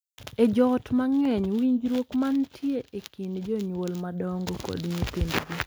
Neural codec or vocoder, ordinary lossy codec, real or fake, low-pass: none; none; real; none